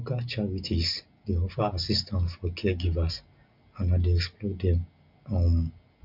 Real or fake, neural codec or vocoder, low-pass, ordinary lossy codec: real; none; 5.4 kHz; MP3, 48 kbps